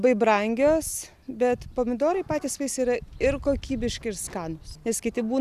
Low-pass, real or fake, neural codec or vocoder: 14.4 kHz; real; none